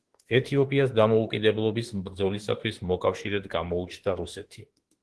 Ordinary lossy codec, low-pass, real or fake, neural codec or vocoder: Opus, 16 kbps; 10.8 kHz; fake; autoencoder, 48 kHz, 32 numbers a frame, DAC-VAE, trained on Japanese speech